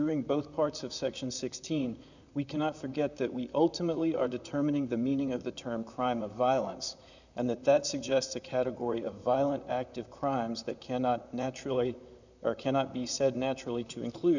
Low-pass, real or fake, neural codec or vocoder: 7.2 kHz; fake; vocoder, 44.1 kHz, 128 mel bands, Pupu-Vocoder